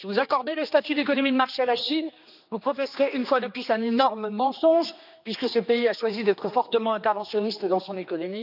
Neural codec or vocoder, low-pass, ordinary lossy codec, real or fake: codec, 16 kHz, 2 kbps, X-Codec, HuBERT features, trained on general audio; 5.4 kHz; none; fake